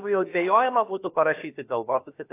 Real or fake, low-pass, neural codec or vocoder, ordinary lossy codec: fake; 3.6 kHz; codec, 16 kHz, about 1 kbps, DyCAST, with the encoder's durations; AAC, 24 kbps